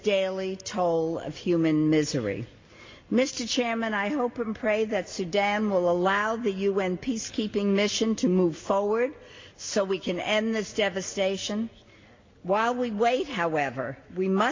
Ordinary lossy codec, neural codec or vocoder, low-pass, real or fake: MP3, 48 kbps; none; 7.2 kHz; real